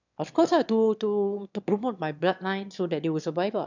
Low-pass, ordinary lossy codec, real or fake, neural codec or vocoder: 7.2 kHz; none; fake; autoencoder, 22.05 kHz, a latent of 192 numbers a frame, VITS, trained on one speaker